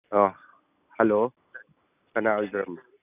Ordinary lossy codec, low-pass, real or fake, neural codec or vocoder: none; 3.6 kHz; real; none